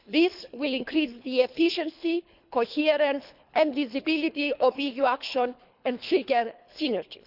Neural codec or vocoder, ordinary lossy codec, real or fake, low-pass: codec, 24 kHz, 3 kbps, HILCodec; none; fake; 5.4 kHz